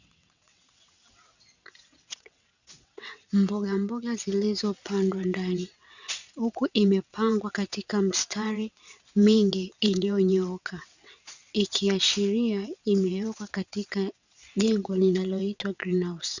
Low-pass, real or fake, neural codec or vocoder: 7.2 kHz; fake; vocoder, 22.05 kHz, 80 mel bands, WaveNeXt